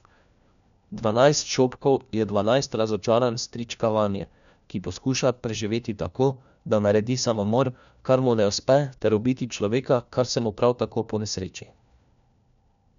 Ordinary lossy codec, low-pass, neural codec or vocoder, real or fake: none; 7.2 kHz; codec, 16 kHz, 1 kbps, FunCodec, trained on LibriTTS, 50 frames a second; fake